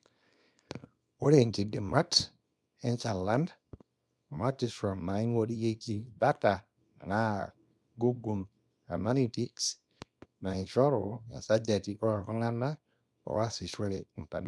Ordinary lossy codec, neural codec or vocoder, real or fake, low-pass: none; codec, 24 kHz, 0.9 kbps, WavTokenizer, small release; fake; none